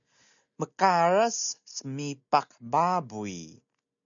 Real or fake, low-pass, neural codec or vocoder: real; 7.2 kHz; none